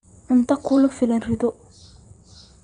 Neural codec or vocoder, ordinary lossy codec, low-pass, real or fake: vocoder, 22.05 kHz, 80 mel bands, WaveNeXt; none; 9.9 kHz; fake